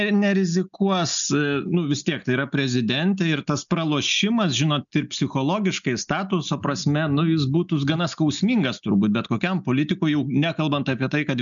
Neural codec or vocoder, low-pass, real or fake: none; 7.2 kHz; real